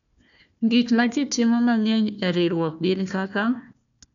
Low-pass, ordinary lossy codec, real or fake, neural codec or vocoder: 7.2 kHz; none; fake; codec, 16 kHz, 1 kbps, FunCodec, trained on Chinese and English, 50 frames a second